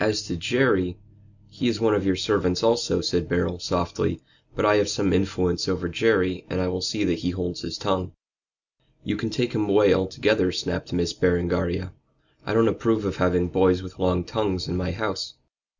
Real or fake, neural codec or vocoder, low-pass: real; none; 7.2 kHz